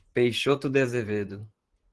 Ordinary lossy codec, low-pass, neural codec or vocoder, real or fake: Opus, 16 kbps; 10.8 kHz; vocoder, 44.1 kHz, 128 mel bands, Pupu-Vocoder; fake